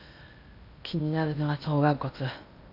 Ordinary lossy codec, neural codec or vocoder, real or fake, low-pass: none; codec, 16 kHz in and 24 kHz out, 0.8 kbps, FocalCodec, streaming, 65536 codes; fake; 5.4 kHz